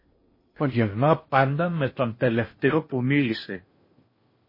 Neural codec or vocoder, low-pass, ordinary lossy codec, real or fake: codec, 16 kHz in and 24 kHz out, 0.6 kbps, FocalCodec, streaming, 2048 codes; 5.4 kHz; MP3, 24 kbps; fake